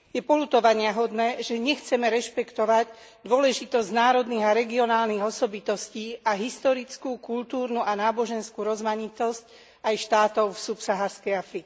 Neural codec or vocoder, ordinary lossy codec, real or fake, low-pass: none; none; real; none